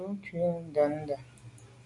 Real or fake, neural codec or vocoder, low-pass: real; none; 10.8 kHz